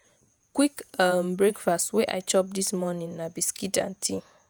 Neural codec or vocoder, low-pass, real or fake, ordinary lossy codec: vocoder, 48 kHz, 128 mel bands, Vocos; none; fake; none